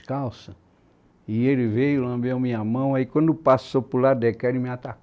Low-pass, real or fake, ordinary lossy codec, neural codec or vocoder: none; real; none; none